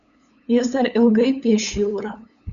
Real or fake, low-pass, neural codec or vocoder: fake; 7.2 kHz; codec, 16 kHz, 8 kbps, FunCodec, trained on LibriTTS, 25 frames a second